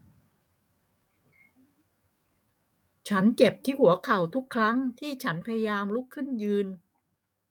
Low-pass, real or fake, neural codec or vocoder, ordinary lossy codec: 19.8 kHz; fake; codec, 44.1 kHz, 7.8 kbps, DAC; none